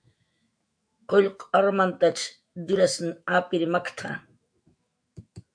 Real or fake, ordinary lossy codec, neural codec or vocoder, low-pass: fake; MP3, 64 kbps; autoencoder, 48 kHz, 128 numbers a frame, DAC-VAE, trained on Japanese speech; 9.9 kHz